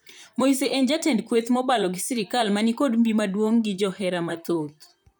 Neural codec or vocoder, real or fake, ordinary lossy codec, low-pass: vocoder, 44.1 kHz, 128 mel bands, Pupu-Vocoder; fake; none; none